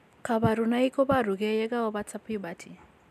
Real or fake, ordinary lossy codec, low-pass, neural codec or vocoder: real; none; 14.4 kHz; none